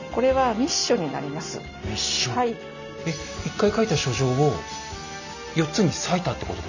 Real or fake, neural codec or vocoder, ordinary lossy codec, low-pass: real; none; none; 7.2 kHz